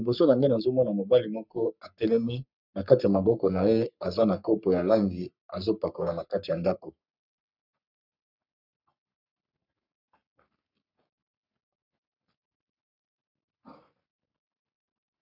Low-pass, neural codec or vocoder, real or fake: 5.4 kHz; codec, 44.1 kHz, 3.4 kbps, Pupu-Codec; fake